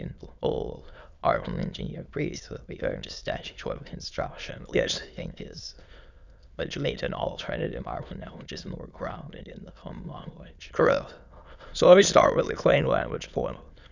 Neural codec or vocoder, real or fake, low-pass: autoencoder, 22.05 kHz, a latent of 192 numbers a frame, VITS, trained on many speakers; fake; 7.2 kHz